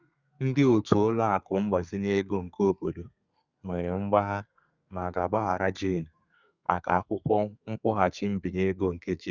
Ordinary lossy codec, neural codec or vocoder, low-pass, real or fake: Opus, 64 kbps; codec, 32 kHz, 1.9 kbps, SNAC; 7.2 kHz; fake